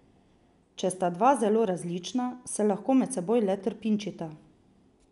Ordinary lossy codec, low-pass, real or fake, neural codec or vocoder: none; 10.8 kHz; real; none